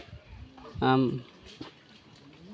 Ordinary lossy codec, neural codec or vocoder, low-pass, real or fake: none; none; none; real